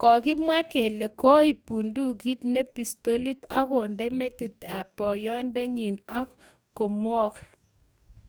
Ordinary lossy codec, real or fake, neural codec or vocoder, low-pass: none; fake; codec, 44.1 kHz, 2.6 kbps, DAC; none